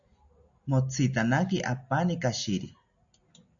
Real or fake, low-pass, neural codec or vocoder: real; 7.2 kHz; none